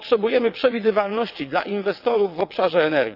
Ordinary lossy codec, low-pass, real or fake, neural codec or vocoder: none; 5.4 kHz; fake; vocoder, 22.05 kHz, 80 mel bands, WaveNeXt